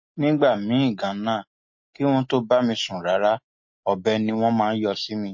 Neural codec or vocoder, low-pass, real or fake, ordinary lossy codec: none; 7.2 kHz; real; MP3, 32 kbps